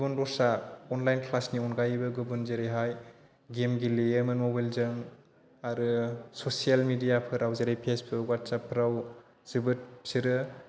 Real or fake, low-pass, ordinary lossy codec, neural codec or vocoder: real; none; none; none